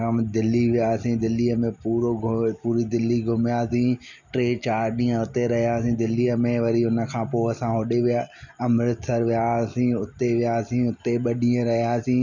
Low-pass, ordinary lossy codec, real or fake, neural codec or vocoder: 7.2 kHz; none; real; none